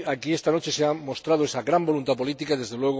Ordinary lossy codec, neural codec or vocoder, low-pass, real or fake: none; none; none; real